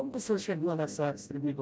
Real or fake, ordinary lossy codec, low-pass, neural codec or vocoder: fake; none; none; codec, 16 kHz, 0.5 kbps, FreqCodec, smaller model